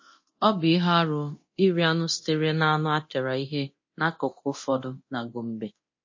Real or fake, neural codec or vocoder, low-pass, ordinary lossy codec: fake; codec, 24 kHz, 0.9 kbps, DualCodec; 7.2 kHz; MP3, 32 kbps